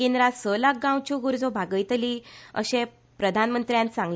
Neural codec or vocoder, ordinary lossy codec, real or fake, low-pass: none; none; real; none